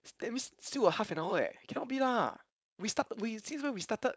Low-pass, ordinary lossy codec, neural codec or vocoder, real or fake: none; none; codec, 16 kHz, 4.8 kbps, FACodec; fake